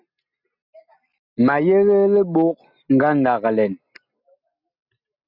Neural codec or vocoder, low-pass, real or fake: none; 5.4 kHz; real